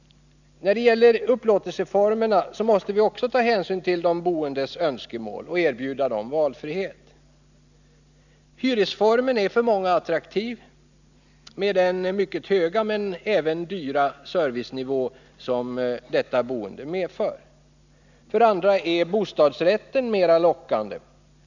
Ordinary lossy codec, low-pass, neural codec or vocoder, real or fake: none; 7.2 kHz; none; real